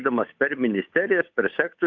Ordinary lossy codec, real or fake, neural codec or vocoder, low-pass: MP3, 64 kbps; fake; codec, 16 kHz, 8 kbps, FunCodec, trained on Chinese and English, 25 frames a second; 7.2 kHz